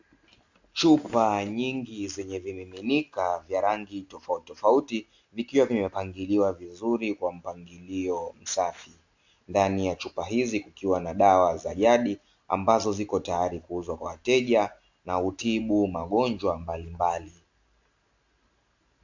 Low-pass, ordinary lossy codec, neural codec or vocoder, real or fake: 7.2 kHz; AAC, 48 kbps; none; real